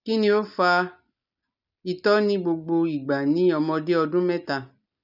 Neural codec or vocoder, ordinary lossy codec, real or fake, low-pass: none; none; real; 5.4 kHz